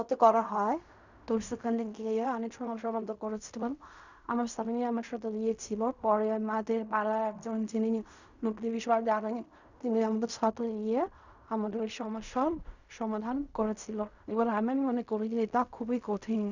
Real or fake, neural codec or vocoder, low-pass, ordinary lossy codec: fake; codec, 16 kHz in and 24 kHz out, 0.4 kbps, LongCat-Audio-Codec, fine tuned four codebook decoder; 7.2 kHz; none